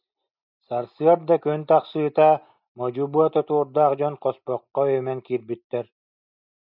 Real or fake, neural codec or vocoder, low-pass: real; none; 5.4 kHz